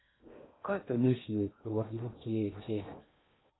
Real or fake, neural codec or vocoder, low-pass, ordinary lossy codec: fake; codec, 16 kHz in and 24 kHz out, 0.6 kbps, FocalCodec, streaming, 4096 codes; 7.2 kHz; AAC, 16 kbps